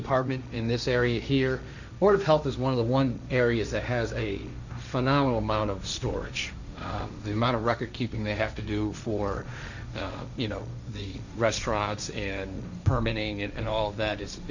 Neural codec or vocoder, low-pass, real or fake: codec, 16 kHz, 1.1 kbps, Voila-Tokenizer; 7.2 kHz; fake